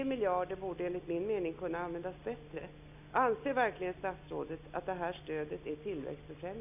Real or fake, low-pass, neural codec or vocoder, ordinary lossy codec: real; 3.6 kHz; none; none